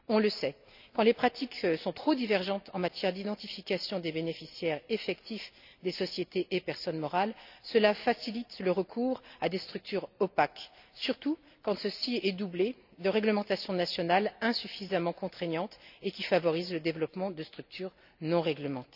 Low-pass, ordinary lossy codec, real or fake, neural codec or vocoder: 5.4 kHz; none; real; none